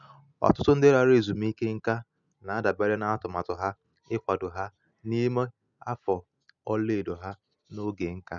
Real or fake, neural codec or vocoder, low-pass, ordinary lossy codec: real; none; 7.2 kHz; none